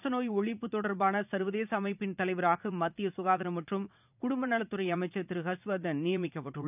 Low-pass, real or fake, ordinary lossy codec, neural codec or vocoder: 3.6 kHz; fake; none; vocoder, 44.1 kHz, 80 mel bands, Vocos